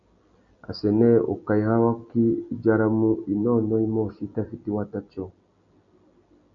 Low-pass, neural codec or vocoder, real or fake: 7.2 kHz; none; real